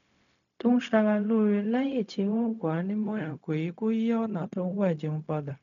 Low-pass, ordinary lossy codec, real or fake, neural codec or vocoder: 7.2 kHz; none; fake; codec, 16 kHz, 0.4 kbps, LongCat-Audio-Codec